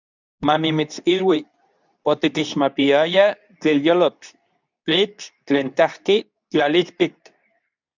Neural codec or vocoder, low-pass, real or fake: codec, 24 kHz, 0.9 kbps, WavTokenizer, medium speech release version 2; 7.2 kHz; fake